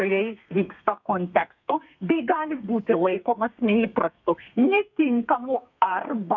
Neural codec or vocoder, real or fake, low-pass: codec, 32 kHz, 1.9 kbps, SNAC; fake; 7.2 kHz